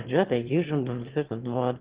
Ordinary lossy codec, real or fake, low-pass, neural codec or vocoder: Opus, 16 kbps; fake; 3.6 kHz; autoencoder, 22.05 kHz, a latent of 192 numbers a frame, VITS, trained on one speaker